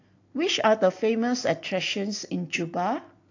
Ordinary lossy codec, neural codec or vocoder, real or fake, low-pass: AAC, 48 kbps; none; real; 7.2 kHz